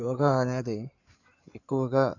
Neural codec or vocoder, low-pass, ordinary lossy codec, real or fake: codec, 16 kHz in and 24 kHz out, 2.2 kbps, FireRedTTS-2 codec; 7.2 kHz; none; fake